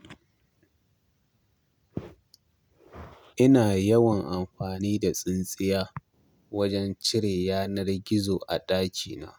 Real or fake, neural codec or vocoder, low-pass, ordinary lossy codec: real; none; none; none